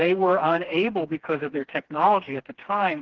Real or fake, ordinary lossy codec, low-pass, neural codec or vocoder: fake; Opus, 16 kbps; 7.2 kHz; codec, 16 kHz, 2 kbps, FreqCodec, smaller model